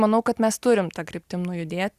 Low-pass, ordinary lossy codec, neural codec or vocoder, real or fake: 14.4 kHz; Opus, 64 kbps; none; real